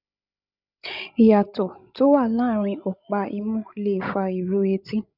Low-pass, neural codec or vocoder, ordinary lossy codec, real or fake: 5.4 kHz; codec, 16 kHz, 16 kbps, FreqCodec, larger model; none; fake